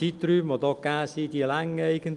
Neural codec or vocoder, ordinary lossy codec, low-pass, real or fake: none; Opus, 32 kbps; 10.8 kHz; real